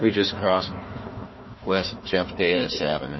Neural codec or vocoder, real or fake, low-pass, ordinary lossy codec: codec, 16 kHz, 1 kbps, FunCodec, trained on Chinese and English, 50 frames a second; fake; 7.2 kHz; MP3, 24 kbps